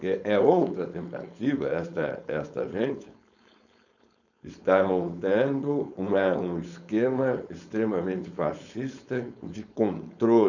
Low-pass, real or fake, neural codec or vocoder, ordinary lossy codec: 7.2 kHz; fake; codec, 16 kHz, 4.8 kbps, FACodec; none